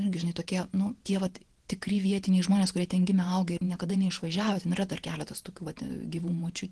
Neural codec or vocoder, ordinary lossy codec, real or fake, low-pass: vocoder, 48 kHz, 128 mel bands, Vocos; Opus, 16 kbps; fake; 10.8 kHz